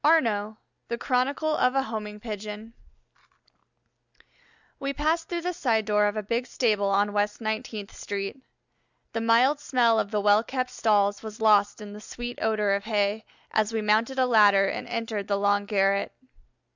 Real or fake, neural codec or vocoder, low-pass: real; none; 7.2 kHz